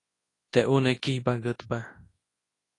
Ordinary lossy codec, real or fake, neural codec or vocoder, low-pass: AAC, 32 kbps; fake; codec, 24 kHz, 0.9 kbps, WavTokenizer, large speech release; 10.8 kHz